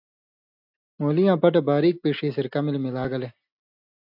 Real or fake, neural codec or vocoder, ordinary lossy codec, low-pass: real; none; AAC, 48 kbps; 5.4 kHz